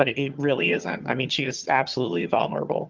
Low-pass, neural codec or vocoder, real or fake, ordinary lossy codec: 7.2 kHz; vocoder, 22.05 kHz, 80 mel bands, HiFi-GAN; fake; Opus, 24 kbps